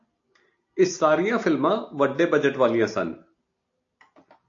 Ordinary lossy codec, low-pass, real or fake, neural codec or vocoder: AAC, 48 kbps; 7.2 kHz; real; none